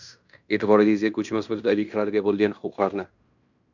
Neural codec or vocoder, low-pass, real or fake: codec, 16 kHz in and 24 kHz out, 0.9 kbps, LongCat-Audio-Codec, fine tuned four codebook decoder; 7.2 kHz; fake